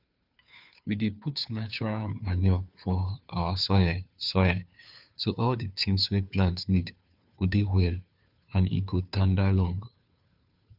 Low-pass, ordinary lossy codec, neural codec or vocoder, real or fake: 5.4 kHz; none; codec, 16 kHz, 2 kbps, FunCodec, trained on Chinese and English, 25 frames a second; fake